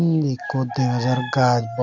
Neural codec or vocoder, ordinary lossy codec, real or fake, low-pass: none; none; real; 7.2 kHz